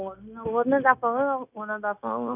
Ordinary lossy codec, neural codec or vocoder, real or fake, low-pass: none; codec, 16 kHz, 0.9 kbps, LongCat-Audio-Codec; fake; 3.6 kHz